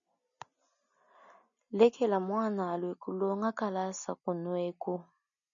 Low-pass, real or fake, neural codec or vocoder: 7.2 kHz; real; none